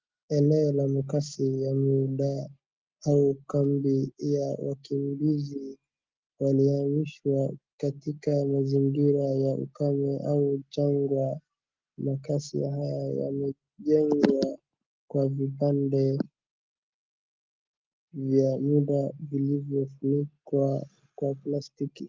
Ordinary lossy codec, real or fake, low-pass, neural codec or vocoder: Opus, 32 kbps; real; 7.2 kHz; none